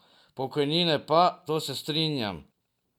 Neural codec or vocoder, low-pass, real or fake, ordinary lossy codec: none; 19.8 kHz; real; none